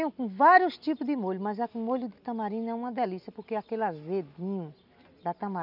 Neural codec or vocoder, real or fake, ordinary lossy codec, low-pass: none; real; none; 5.4 kHz